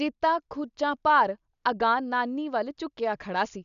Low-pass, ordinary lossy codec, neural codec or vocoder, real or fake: 7.2 kHz; none; none; real